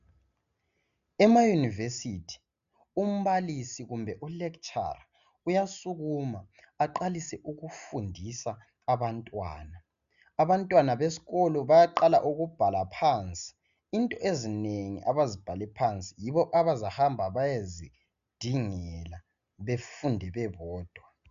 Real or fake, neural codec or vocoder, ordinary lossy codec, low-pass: real; none; MP3, 96 kbps; 7.2 kHz